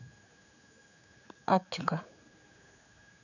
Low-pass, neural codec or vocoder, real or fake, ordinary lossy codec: 7.2 kHz; codec, 16 kHz, 4 kbps, X-Codec, HuBERT features, trained on general audio; fake; none